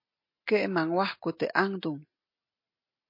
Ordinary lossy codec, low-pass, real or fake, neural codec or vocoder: MP3, 32 kbps; 5.4 kHz; real; none